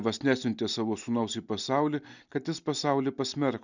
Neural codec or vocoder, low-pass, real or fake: none; 7.2 kHz; real